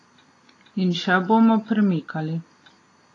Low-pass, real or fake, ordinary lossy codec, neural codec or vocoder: 10.8 kHz; real; AAC, 32 kbps; none